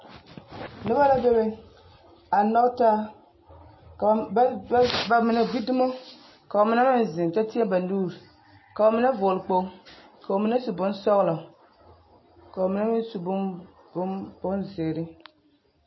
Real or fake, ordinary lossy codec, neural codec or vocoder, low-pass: real; MP3, 24 kbps; none; 7.2 kHz